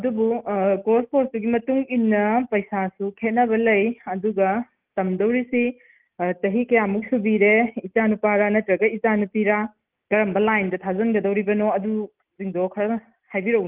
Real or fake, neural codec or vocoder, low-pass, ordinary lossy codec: real; none; 3.6 kHz; Opus, 24 kbps